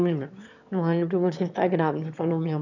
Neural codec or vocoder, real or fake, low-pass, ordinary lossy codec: autoencoder, 22.05 kHz, a latent of 192 numbers a frame, VITS, trained on one speaker; fake; 7.2 kHz; none